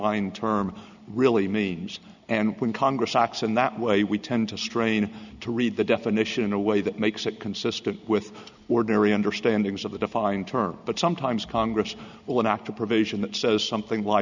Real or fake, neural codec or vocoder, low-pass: real; none; 7.2 kHz